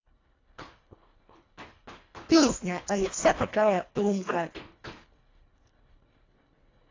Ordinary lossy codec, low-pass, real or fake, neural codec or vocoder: AAC, 32 kbps; 7.2 kHz; fake; codec, 24 kHz, 1.5 kbps, HILCodec